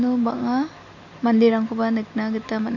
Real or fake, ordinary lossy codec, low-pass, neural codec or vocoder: real; none; 7.2 kHz; none